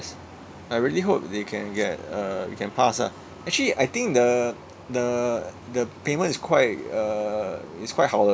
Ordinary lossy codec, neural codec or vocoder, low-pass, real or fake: none; none; none; real